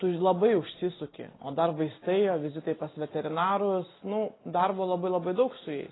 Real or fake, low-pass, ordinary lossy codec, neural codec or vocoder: real; 7.2 kHz; AAC, 16 kbps; none